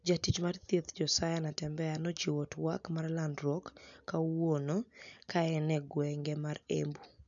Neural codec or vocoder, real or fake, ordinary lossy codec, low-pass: none; real; none; 7.2 kHz